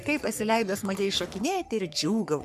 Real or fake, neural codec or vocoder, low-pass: fake; codec, 44.1 kHz, 3.4 kbps, Pupu-Codec; 14.4 kHz